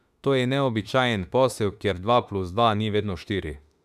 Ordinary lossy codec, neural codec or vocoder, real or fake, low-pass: none; autoencoder, 48 kHz, 32 numbers a frame, DAC-VAE, trained on Japanese speech; fake; 14.4 kHz